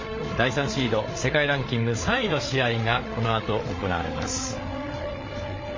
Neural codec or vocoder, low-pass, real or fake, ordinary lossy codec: codec, 16 kHz, 8 kbps, FreqCodec, larger model; 7.2 kHz; fake; MP3, 32 kbps